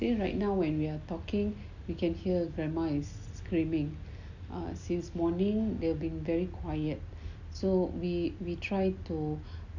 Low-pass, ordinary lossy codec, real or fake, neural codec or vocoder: 7.2 kHz; none; real; none